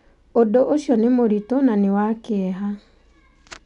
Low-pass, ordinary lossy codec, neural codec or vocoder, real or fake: 10.8 kHz; none; none; real